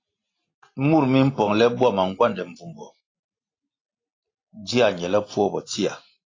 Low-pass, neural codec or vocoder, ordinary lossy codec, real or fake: 7.2 kHz; none; AAC, 32 kbps; real